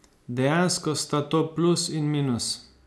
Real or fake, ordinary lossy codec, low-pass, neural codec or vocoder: real; none; none; none